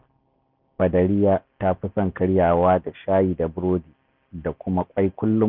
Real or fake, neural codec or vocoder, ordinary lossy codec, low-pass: real; none; none; 5.4 kHz